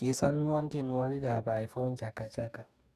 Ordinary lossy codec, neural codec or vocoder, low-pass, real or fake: none; codec, 44.1 kHz, 2.6 kbps, DAC; 14.4 kHz; fake